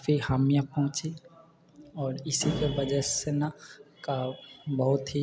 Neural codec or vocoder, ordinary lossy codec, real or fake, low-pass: none; none; real; none